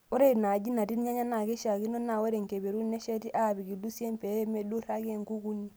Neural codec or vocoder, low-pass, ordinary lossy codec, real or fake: none; none; none; real